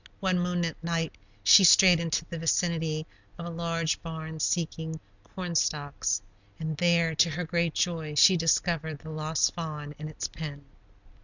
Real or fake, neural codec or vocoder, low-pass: real; none; 7.2 kHz